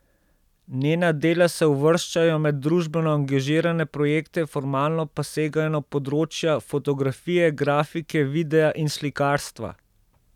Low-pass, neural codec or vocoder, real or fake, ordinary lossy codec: 19.8 kHz; none; real; none